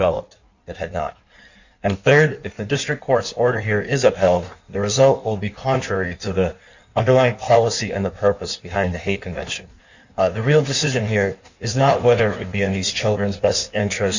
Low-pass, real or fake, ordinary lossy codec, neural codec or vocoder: 7.2 kHz; fake; Opus, 64 kbps; codec, 16 kHz in and 24 kHz out, 1.1 kbps, FireRedTTS-2 codec